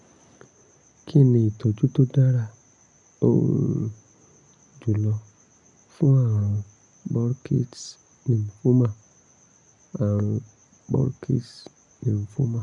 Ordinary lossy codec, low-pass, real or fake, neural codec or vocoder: none; 10.8 kHz; real; none